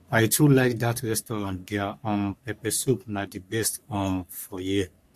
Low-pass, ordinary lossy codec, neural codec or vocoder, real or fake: 14.4 kHz; MP3, 64 kbps; codec, 44.1 kHz, 3.4 kbps, Pupu-Codec; fake